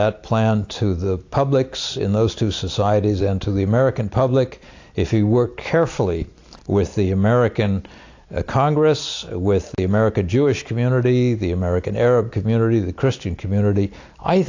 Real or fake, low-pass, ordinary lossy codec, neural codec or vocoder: real; 7.2 kHz; AAC, 48 kbps; none